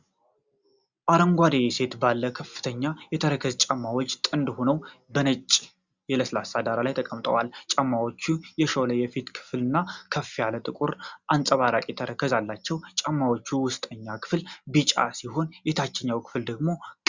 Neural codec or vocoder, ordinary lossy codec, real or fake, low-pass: none; Opus, 64 kbps; real; 7.2 kHz